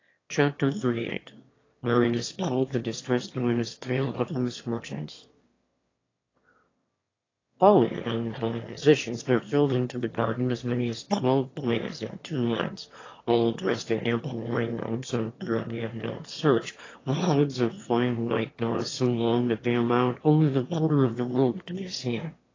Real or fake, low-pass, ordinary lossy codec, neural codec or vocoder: fake; 7.2 kHz; AAC, 32 kbps; autoencoder, 22.05 kHz, a latent of 192 numbers a frame, VITS, trained on one speaker